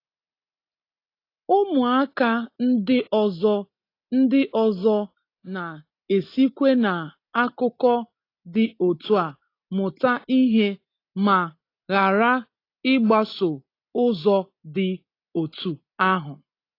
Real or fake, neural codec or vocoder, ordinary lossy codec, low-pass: real; none; AAC, 32 kbps; 5.4 kHz